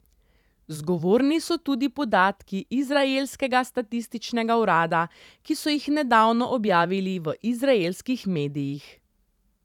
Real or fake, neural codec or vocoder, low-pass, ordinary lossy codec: fake; vocoder, 44.1 kHz, 128 mel bands every 512 samples, BigVGAN v2; 19.8 kHz; none